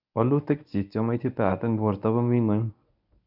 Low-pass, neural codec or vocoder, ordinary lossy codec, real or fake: 5.4 kHz; codec, 24 kHz, 0.9 kbps, WavTokenizer, medium speech release version 1; none; fake